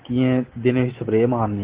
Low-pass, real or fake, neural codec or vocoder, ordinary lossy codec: 3.6 kHz; real; none; Opus, 16 kbps